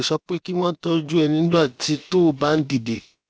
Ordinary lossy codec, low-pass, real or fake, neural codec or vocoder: none; none; fake; codec, 16 kHz, about 1 kbps, DyCAST, with the encoder's durations